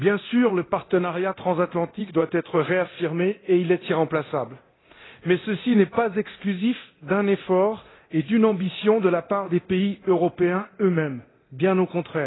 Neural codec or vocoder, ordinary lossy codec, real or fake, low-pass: codec, 24 kHz, 0.9 kbps, DualCodec; AAC, 16 kbps; fake; 7.2 kHz